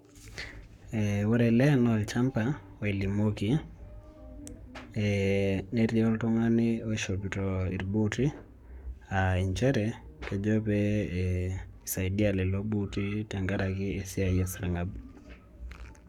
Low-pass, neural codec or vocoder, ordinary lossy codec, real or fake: 19.8 kHz; codec, 44.1 kHz, 7.8 kbps, Pupu-Codec; none; fake